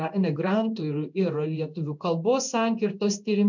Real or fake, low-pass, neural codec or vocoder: fake; 7.2 kHz; codec, 16 kHz in and 24 kHz out, 1 kbps, XY-Tokenizer